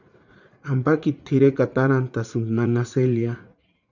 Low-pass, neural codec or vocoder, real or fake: 7.2 kHz; vocoder, 44.1 kHz, 80 mel bands, Vocos; fake